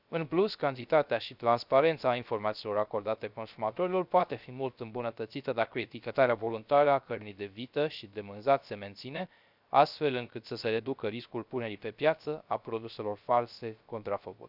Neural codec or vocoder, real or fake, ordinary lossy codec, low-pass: codec, 16 kHz, 0.3 kbps, FocalCodec; fake; none; 5.4 kHz